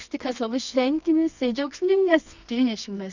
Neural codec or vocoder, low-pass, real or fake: codec, 24 kHz, 0.9 kbps, WavTokenizer, medium music audio release; 7.2 kHz; fake